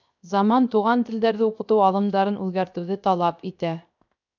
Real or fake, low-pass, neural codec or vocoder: fake; 7.2 kHz; codec, 16 kHz, 0.7 kbps, FocalCodec